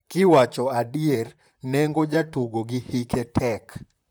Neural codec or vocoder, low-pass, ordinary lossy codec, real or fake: vocoder, 44.1 kHz, 128 mel bands, Pupu-Vocoder; none; none; fake